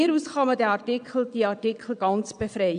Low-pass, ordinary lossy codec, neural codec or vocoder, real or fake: 9.9 kHz; none; vocoder, 22.05 kHz, 80 mel bands, Vocos; fake